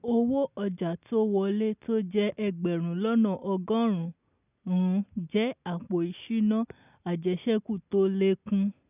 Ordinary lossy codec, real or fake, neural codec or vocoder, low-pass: none; real; none; 3.6 kHz